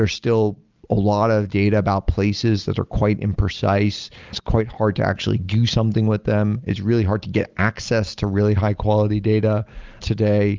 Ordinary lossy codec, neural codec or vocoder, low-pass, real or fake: Opus, 24 kbps; none; 7.2 kHz; real